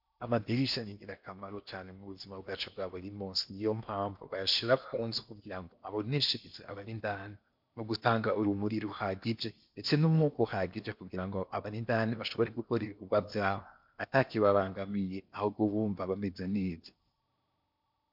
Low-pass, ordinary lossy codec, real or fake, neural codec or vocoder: 5.4 kHz; MP3, 48 kbps; fake; codec, 16 kHz in and 24 kHz out, 0.8 kbps, FocalCodec, streaming, 65536 codes